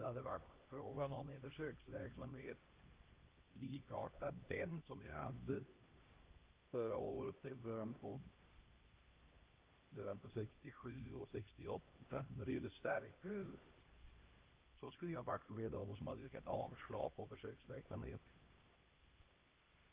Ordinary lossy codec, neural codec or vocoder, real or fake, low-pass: Opus, 32 kbps; codec, 16 kHz, 1 kbps, X-Codec, HuBERT features, trained on LibriSpeech; fake; 3.6 kHz